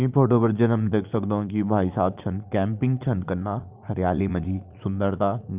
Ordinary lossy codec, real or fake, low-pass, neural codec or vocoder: Opus, 24 kbps; fake; 3.6 kHz; vocoder, 44.1 kHz, 80 mel bands, Vocos